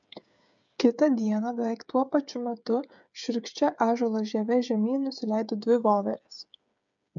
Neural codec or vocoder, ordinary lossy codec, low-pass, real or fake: codec, 16 kHz, 8 kbps, FreqCodec, smaller model; MP3, 64 kbps; 7.2 kHz; fake